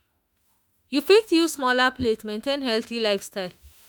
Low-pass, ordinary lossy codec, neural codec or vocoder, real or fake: none; none; autoencoder, 48 kHz, 32 numbers a frame, DAC-VAE, trained on Japanese speech; fake